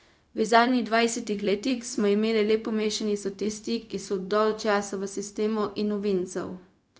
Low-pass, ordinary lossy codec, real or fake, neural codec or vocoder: none; none; fake; codec, 16 kHz, 0.4 kbps, LongCat-Audio-Codec